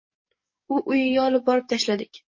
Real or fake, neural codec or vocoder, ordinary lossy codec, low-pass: fake; codec, 44.1 kHz, 7.8 kbps, DAC; MP3, 32 kbps; 7.2 kHz